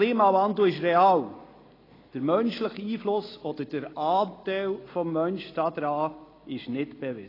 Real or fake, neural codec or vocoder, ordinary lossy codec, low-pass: real; none; AAC, 24 kbps; 5.4 kHz